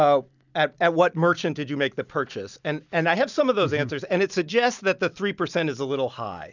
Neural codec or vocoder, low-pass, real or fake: none; 7.2 kHz; real